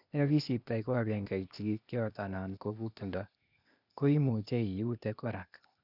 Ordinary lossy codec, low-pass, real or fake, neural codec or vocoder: none; 5.4 kHz; fake; codec, 16 kHz, 0.8 kbps, ZipCodec